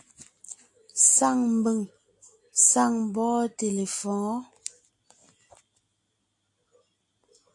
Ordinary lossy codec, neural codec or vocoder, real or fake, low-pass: AAC, 64 kbps; none; real; 10.8 kHz